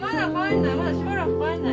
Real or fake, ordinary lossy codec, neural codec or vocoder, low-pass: real; none; none; none